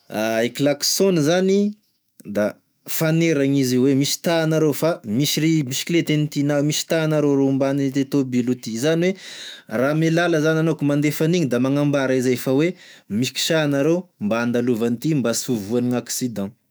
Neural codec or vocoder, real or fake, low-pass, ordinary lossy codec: autoencoder, 48 kHz, 128 numbers a frame, DAC-VAE, trained on Japanese speech; fake; none; none